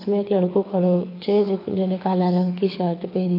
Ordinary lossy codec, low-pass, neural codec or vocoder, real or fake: AAC, 32 kbps; 5.4 kHz; codec, 24 kHz, 6 kbps, HILCodec; fake